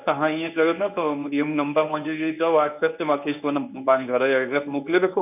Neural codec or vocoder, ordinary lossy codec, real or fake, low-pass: codec, 24 kHz, 0.9 kbps, WavTokenizer, medium speech release version 1; none; fake; 3.6 kHz